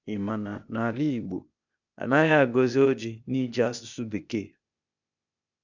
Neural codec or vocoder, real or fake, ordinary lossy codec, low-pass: codec, 16 kHz, 0.8 kbps, ZipCodec; fake; none; 7.2 kHz